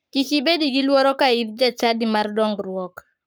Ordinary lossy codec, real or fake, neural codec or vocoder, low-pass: none; fake; codec, 44.1 kHz, 7.8 kbps, Pupu-Codec; none